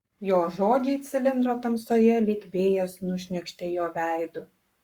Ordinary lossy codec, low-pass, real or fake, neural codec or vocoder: Opus, 64 kbps; 19.8 kHz; fake; codec, 44.1 kHz, 7.8 kbps, Pupu-Codec